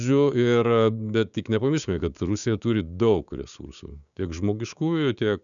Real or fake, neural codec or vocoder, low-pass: fake; codec, 16 kHz, 6 kbps, DAC; 7.2 kHz